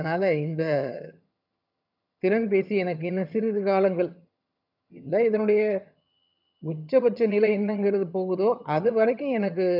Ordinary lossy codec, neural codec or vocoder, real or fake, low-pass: none; vocoder, 22.05 kHz, 80 mel bands, HiFi-GAN; fake; 5.4 kHz